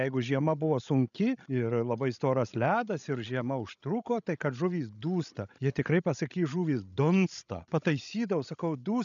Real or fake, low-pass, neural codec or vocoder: fake; 7.2 kHz; codec, 16 kHz, 16 kbps, FreqCodec, larger model